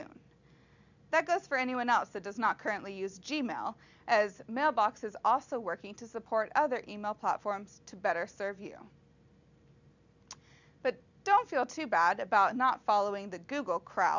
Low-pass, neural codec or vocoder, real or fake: 7.2 kHz; none; real